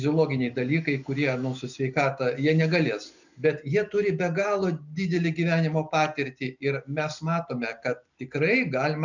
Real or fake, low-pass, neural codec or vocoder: real; 7.2 kHz; none